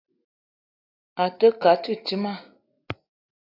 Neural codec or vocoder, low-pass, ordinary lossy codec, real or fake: none; 5.4 kHz; AAC, 24 kbps; real